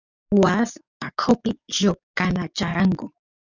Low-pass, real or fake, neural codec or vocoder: 7.2 kHz; fake; codec, 44.1 kHz, 7.8 kbps, DAC